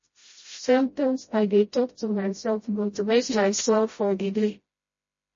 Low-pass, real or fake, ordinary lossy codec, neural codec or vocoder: 7.2 kHz; fake; MP3, 32 kbps; codec, 16 kHz, 0.5 kbps, FreqCodec, smaller model